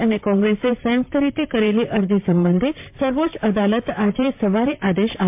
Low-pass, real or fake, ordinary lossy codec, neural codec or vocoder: 3.6 kHz; fake; MP3, 32 kbps; vocoder, 44.1 kHz, 128 mel bands, Pupu-Vocoder